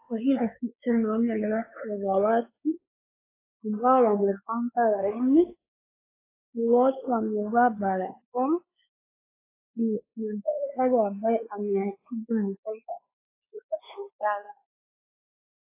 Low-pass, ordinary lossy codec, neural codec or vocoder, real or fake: 3.6 kHz; AAC, 24 kbps; codec, 16 kHz, 4 kbps, X-Codec, WavLM features, trained on Multilingual LibriSpeech; fake